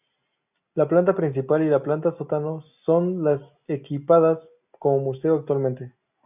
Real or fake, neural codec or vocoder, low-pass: real; none; 3.6 kHz